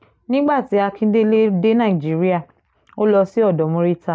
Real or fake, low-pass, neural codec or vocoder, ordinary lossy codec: real; none; none; none